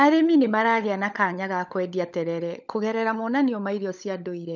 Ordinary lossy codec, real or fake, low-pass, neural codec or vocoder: none; fake; 7.2 kHz; codec, 16 kHz, 16 kbps, FunCodec, trained on LibriTTS, 50 frames a second